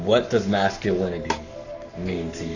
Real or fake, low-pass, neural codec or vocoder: fake; 7.2 kHz; codec, 44.1 kHz, 7.8 kbps, Pupu-Codec